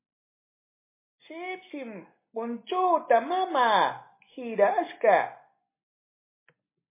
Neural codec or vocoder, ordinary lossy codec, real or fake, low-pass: none; MP3, 24 kbps; real; 3.6 kHz